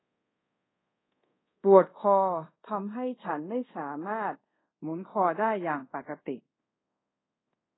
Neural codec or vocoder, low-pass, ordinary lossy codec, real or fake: codec, 24 kHz, 0.5 kbps, DualCodec; 7.2 kHz; AAC, 16 kbps; fake